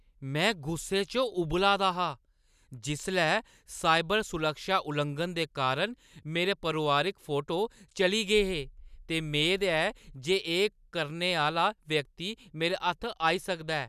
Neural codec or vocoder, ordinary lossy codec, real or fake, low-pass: none; none; real; 14.4 kHz